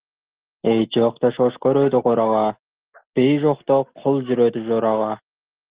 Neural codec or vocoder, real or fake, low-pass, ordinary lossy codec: none; real; 3.6 kHz; Opus, 16 kbps